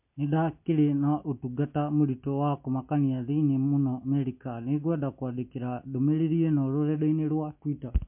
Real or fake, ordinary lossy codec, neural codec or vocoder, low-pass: real; MP3, 32 kbps; none; 3.6 kHz